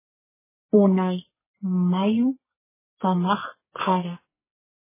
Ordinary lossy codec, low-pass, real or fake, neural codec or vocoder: MP3, 16 kbps; 3.6 kHz; fake; codec, 44.1 kHz, 2.6 kbps, SNAC